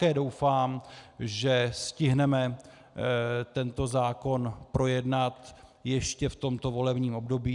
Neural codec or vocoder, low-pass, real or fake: none; 10.8 kHz; real